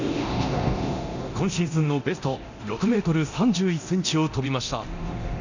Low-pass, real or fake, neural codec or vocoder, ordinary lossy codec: 7.2 kHz; fake; codec, 24 kHz, 0.9 kbps, DualCodec; none